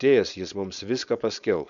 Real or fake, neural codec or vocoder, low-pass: fake; codec, 16 kHz, 4.8 kbps, FACodec; 7.2 kHz